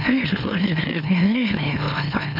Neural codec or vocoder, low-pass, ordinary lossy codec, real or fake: autoencoder, 44.1 kHz, a latent of 192 numbers a frame, MeloTTS; 5.4 kHz; none; fake